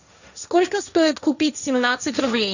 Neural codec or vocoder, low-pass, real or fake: codec, 16 kHz, 1.1 kbps, Voila-Tokenizer; 7.2 kHz; fake